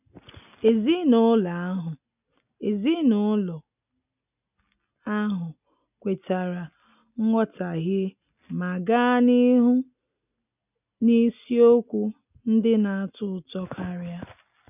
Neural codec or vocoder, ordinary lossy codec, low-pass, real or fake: none; none; 3.6 kHz; real